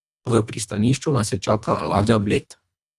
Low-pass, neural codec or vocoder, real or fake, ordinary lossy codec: none; codec, 24 kHz, 1.5 kbps, HILCodec; fake; none